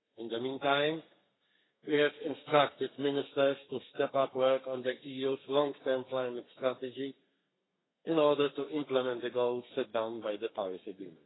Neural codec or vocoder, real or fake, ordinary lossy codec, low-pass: codec, 32 kHz, 1.9 kbps, SNAC; fake; AAC, 16 kbps; 7.2 kHz